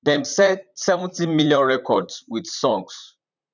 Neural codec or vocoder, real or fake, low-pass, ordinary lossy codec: vocoder, 44.1 kHz, 128 mel bands, Pupu-Vocoder; fake; 7.2 kHz; none